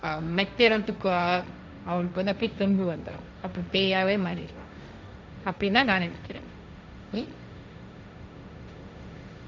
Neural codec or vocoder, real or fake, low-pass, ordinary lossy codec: codec, 16 kHz, 1.1 kbps, Voila-Tokenizer; fake; none; none